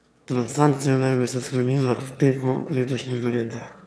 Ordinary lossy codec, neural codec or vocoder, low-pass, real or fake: none; autoencoder, 22.05 kHz, a latent of 192 numbers a frame, VITS, trained on one speaker; none; fake